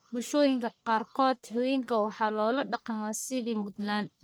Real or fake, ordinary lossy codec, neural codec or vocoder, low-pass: fake; none; codec, 44.1 kHz, 1.7 kbps, Pupu-Codec; none